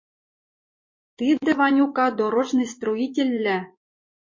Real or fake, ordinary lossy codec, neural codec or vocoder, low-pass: fake; MP3, 32 kbps; vocoder, 24 kHz, 100 mel bands, Vocos; 7.2 kHz